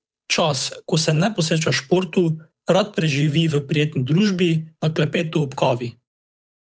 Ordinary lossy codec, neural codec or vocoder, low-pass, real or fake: none; codec, 16 kHz, 8 kbps, FunCodec, trained on Chinese and English, 25 frames a second; none; fake